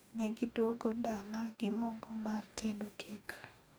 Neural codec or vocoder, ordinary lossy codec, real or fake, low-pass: codec, 44.1 kHz, 2.6 kbps, DAC; none; fake; none